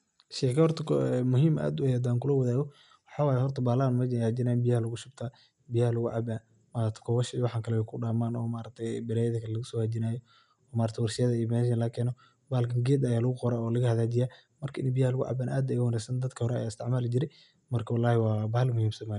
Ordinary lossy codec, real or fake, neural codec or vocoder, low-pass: none; real; none; 10.8 kHz